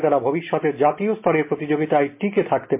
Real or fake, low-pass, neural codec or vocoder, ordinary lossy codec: real; 3.6 kHz; none; MP3, 24 kbps